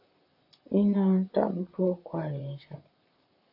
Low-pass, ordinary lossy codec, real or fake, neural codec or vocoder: 5.4 kHz; AAC, 48 kbps; fake; vocoder, 44.1 kHz, 128 mel bands, Pupu-Vocoder